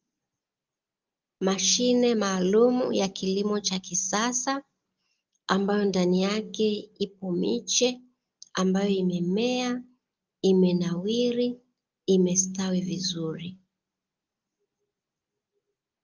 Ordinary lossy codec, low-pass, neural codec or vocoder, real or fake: Opus, 32 kbps; 7.2 kHz; none; real